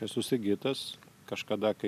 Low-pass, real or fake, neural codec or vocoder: 14.4 kHz; real; none